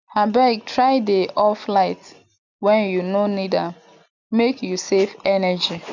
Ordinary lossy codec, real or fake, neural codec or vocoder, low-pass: none; real; none; 7.2 kHz